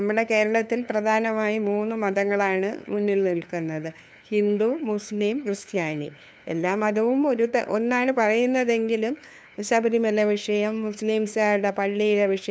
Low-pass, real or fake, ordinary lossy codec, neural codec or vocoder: none; fake; none; codec, 16 kHz, 2 kbps, FunCodec, trained on LibriTTS, 25 frames a second